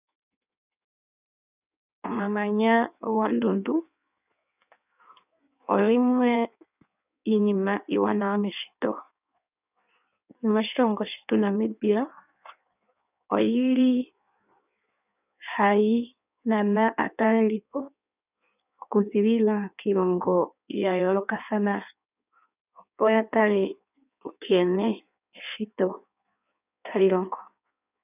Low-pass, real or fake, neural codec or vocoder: 3.6 kHz; fake; codec, 16 kHz in and 24 kHz out, 1.1 kbps, FireRedTTS-2 codec